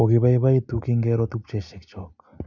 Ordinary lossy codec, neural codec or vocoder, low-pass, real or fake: none; none; 7.2 kHz; real